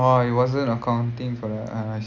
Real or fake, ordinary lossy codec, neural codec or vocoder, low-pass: real; none; none; 7.2 kHz